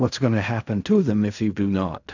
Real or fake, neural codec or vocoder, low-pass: fake; codec, 16 kHz in and 24 kHz out, 0.4 kbps, LongCat-Audio-Codec, fine tuned four codebook decoder; 7.2 kHz